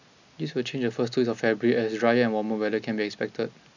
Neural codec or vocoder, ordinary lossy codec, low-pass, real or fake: none; none; 7.2 kHz; real